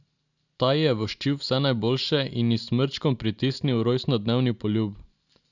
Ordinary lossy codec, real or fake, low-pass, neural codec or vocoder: none; real; 7.2 kHz; none